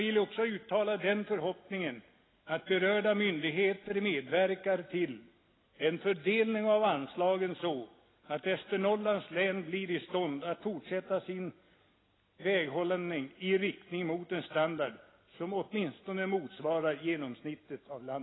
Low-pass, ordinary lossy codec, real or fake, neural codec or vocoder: 7.2 kHz; AAC, 16 kbps; real; none